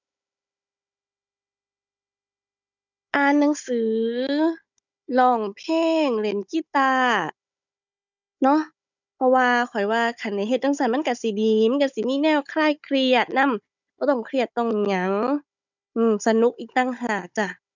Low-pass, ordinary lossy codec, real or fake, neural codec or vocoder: 7.2 kHz; none; fake; codec, 16 kHz, 16 kbps, FunCodec, trained on Chinese and English, 50 frames a second